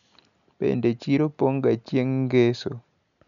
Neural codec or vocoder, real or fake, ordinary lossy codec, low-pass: none; real; none; 7.2 kHz